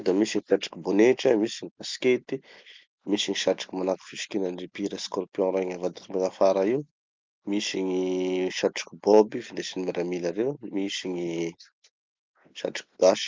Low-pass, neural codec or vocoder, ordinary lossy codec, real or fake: 7.2 kHz; none; Opus, 32 kbps; real